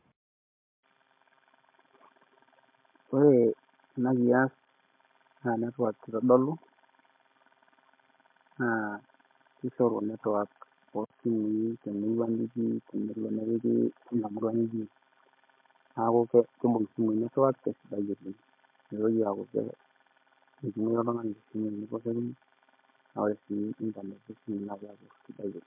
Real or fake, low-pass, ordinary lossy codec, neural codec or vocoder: real; 3.6 kHz; MP3, 32 kbps; none